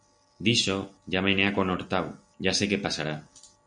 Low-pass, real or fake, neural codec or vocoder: 9.9 kHz; real; none